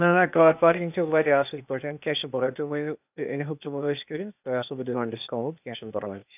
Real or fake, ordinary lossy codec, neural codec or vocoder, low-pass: fake; none; codec, 16 kHz, 0.8 kbps, ZipCodec; 3.6 kHz